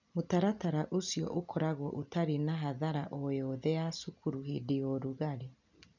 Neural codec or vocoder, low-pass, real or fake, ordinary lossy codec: none; 7.2 kHz; real; none